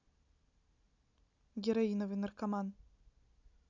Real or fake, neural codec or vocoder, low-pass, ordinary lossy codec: real; none; 7.2 kHz; none